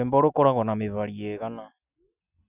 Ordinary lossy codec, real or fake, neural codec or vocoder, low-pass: none; real; none; 3.6 kHz